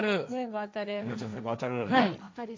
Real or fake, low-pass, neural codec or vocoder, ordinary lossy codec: fake; none; codec, 16 kHz, 1.1 kbps, Voila-Tokenizer; none